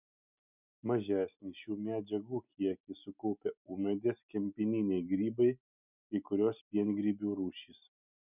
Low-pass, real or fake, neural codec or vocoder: 3.6 kHz; real; none